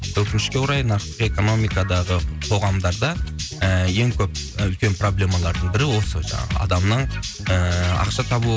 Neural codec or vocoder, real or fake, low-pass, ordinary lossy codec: none; real; none; none